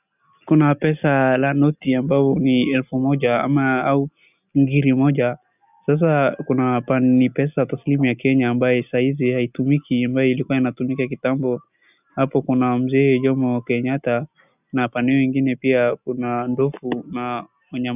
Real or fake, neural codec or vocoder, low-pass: real; none; 3.6 kHz